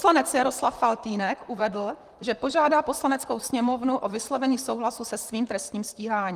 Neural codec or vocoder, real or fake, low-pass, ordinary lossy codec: vocoder, 44.1 kHz, 128 mel bands, Pupu-Vocoder; fake; 14.4 kHz; Opus, 24 kbps